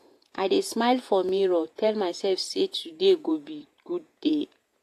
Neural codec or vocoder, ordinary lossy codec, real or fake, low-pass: vocoder, 48 kHz, 128 mel bands, Vocos; AAC, 64 kbps; fake; 14.4 kHz